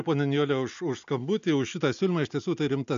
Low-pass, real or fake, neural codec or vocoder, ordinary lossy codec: 7.2 kHz; real; none; MP3, 64 kbps